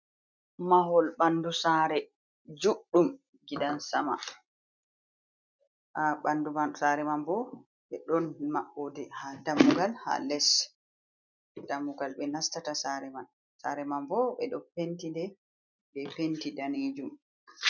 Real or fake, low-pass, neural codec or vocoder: real; 7.2 kHz; none